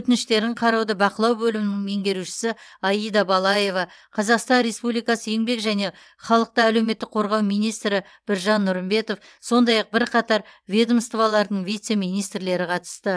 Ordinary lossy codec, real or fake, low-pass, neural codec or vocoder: none; fake; none; vocoder, 22.05 kHz, 80 mel bands, WaveNeXt